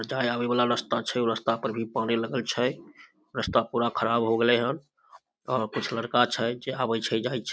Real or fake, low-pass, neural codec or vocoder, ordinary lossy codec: real; none; none; none